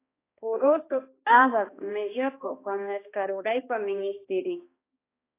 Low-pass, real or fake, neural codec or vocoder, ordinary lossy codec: 3.6 kHz; fake; codec, 16 kHz, 1 kbps, X-Codec, HuBERT features, trained on balanced general audio; AAC, 24 kbps